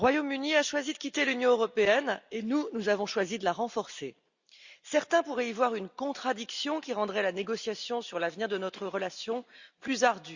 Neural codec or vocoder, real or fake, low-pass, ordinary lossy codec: none; real; 7.2 kHz; Opus, 64 kbps